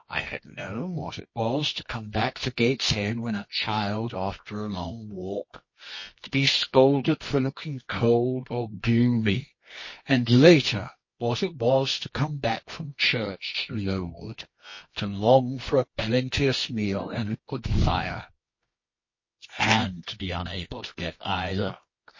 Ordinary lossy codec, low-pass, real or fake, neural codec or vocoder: MP3, 32 kbps; 7.2 kHz; fake; codec, 24 kHz, 0.9 kbps, WavTokenizer, medium music audio release